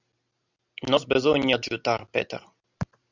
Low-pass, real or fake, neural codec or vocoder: 7.2 kHz; real; none